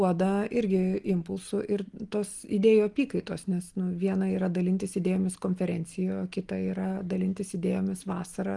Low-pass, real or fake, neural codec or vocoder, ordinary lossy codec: 10.8 kHz; real; none; Opus, 24 kbps